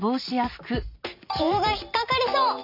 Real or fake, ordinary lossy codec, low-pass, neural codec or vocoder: fake; none; 5.4 kHz; vocoder, 44.1 kHz, 128 mel bands every 512 samples, BigVGAN v2